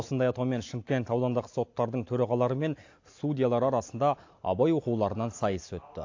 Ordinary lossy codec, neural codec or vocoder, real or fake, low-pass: AAC, 48 kbps; none; real; 7.2 kHz